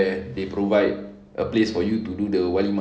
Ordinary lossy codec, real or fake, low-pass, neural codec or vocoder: none; real; none; none